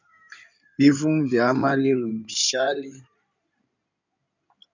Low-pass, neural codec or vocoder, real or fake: 7.2 kHz; codec, 16 kHz in and 24 kHz out, 2.2 kbps, FireRedTTS-2 codec; fake